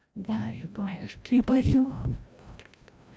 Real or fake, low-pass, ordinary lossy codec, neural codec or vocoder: fake; none; none; codec, 16 kHz, 0.5 kbps, FreqCodec, larger model